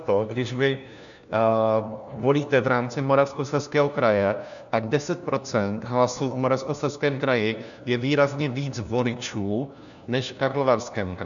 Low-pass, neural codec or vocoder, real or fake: 7.2 kHz; codec, 16 kHz, 1 kbps, FunCodec, trained on LibriTTS, 50 frames a second; fake